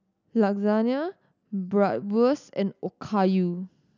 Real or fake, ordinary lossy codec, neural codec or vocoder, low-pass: real; none; none; 7.2 kHz